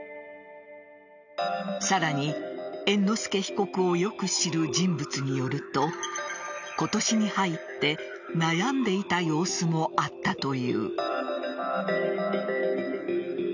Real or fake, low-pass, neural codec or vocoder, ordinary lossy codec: real; 7.2 kHz; none; none